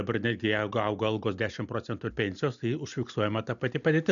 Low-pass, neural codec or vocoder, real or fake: 7.2 kHz; none; real